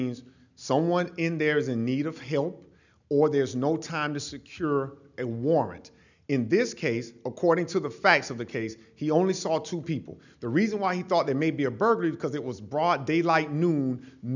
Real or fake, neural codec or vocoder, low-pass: real; none; 7.2 kHz